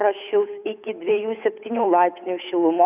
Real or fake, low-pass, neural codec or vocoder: fake; 3.6 kHz; codec, 16 kHz, 8 kbps, FunCodec, trained on Chinese and English, 25 frames a second